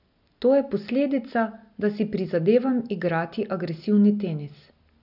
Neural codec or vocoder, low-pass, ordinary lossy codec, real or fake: none; 5.4 kHz; none; real